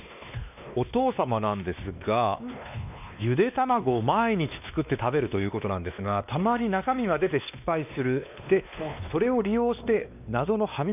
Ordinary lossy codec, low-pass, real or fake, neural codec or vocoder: none; 3.6 kHz; fake; codec, 16 kHz, 2 kbps, X-Codec, WavLM features, trained on Multilingual LibriSpeech